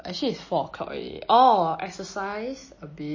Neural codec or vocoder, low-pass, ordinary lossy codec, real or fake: none; 7.2 kHz; MP3, 32 kbps; real